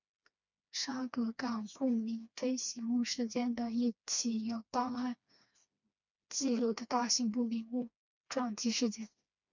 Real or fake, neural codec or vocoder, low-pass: fake; codec, 16 kHz, 2 kbps, FreqCodec, smaller model; 7.2 kHz